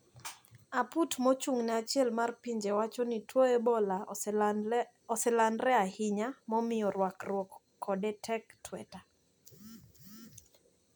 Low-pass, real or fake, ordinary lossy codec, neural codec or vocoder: none; real; none; none